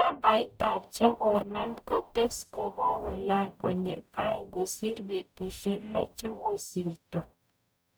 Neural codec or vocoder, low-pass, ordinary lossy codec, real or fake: codec, 44.1 kHz, 0.9 kbps, DAC; none; none; fake